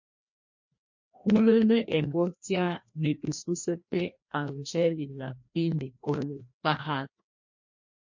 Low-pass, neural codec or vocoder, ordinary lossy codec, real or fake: 7.2 kHz; codec, 16 kHz, 1 kbps, FreqCodec, larger model; MP3, 48 kbps; fake